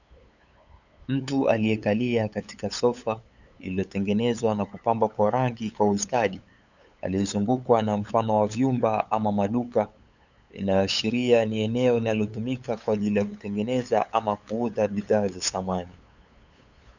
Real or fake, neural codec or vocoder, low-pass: fake; codec, 16 kHz, 8 kbps, FunCodec, trained on LibriTTS, 25 frames a second; 7.2 kHz